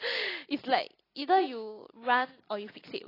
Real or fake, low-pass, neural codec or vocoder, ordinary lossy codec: real; 5.4 kHz; none; AAC, 24 kbps